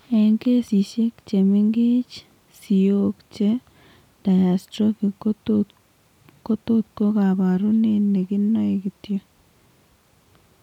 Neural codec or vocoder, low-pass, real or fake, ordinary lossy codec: none; 19.8 kHz; real; none